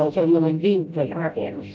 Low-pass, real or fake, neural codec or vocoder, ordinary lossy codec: none; fake; codec, 16 kHz, 0.5 kbps, FreqCodec, smaller model; none